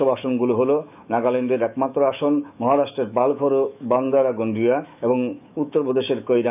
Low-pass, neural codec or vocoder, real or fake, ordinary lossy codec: 3.6 kHz; autoencoder, 48 kHz, 128 numbers a frame, DAC-VAE, trained on Japanese speech; fake; none